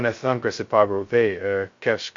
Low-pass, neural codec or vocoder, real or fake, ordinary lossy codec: 7.2 kHz; codec, 16 kHz, 0.2 kbps, FocalCodec; fake; MP3, 48 kbps